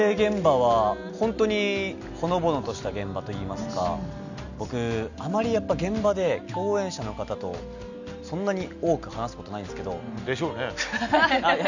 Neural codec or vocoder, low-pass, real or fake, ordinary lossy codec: none; 7.2 kHz; real; none